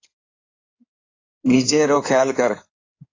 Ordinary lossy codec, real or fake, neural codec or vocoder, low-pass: AAC, 32 kbps; fake; codec, 16 kHz in and 24 kHz out, 2.2 kbps, FireRedTTS-2 codec; 7.2 kHz